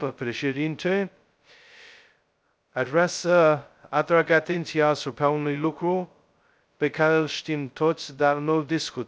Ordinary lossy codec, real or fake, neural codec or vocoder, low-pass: none; fake; codec, 16 kHz, 0.2 kbps, FocalCodec; none